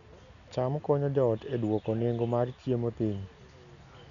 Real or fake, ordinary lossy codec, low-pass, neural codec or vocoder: real; none; 7.2 kHz; none